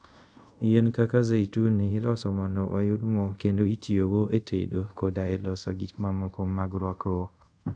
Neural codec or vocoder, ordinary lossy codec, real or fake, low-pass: codec, 24 kHz, 0.5 kbps, DualCodec; none; fake; 9.9 kHz